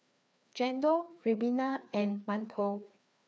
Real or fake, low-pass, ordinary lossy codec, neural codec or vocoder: fake; none; none; codec, 16 kHz, 2 kbps, FreqCodec, larger model